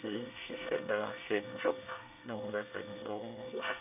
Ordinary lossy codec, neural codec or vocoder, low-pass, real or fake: none; codec, 24 kHz, 1 kbps, SNAC; 3.6 kHz; fake